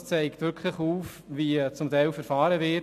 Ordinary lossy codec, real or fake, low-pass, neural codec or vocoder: MP3, 96 kbps; real; 14.4 kHz; none